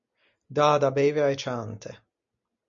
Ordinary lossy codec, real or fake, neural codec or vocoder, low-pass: MP3, 96 kbps; real; none; 7.2 kHz